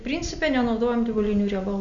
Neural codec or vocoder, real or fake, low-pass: none; real; 7.2 kHz